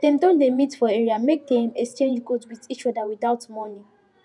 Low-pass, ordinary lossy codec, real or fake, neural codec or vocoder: 10.8 kHz; none; fake; vocoder, 48 kHz, 128 mel bands, Vocos